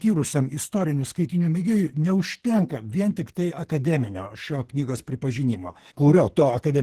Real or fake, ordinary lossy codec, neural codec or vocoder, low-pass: fake; Opus, 16 kbps; codec, 44.1 kHz, 2.6 kbps, SNAC; 14.4 kHz